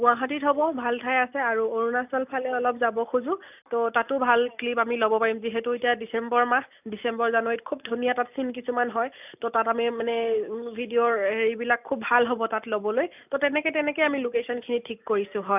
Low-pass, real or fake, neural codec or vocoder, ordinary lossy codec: 3.6 kHz; real; none; none